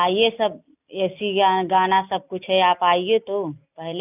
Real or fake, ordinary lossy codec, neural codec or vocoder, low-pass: real; none; none; 3.6 kHz